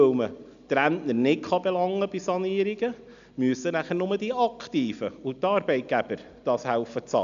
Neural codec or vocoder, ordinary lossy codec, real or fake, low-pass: none; AAC, 96 kbps; real; 7.2 kHz